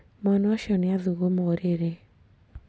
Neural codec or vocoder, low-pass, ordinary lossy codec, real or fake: none; none; none; real